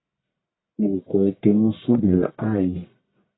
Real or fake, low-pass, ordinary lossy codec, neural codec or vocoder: fake; 7.2 kHz; AAC, 16 kbps; codec, 44.1 kHz, 1.7 kbps, Pupu-Codec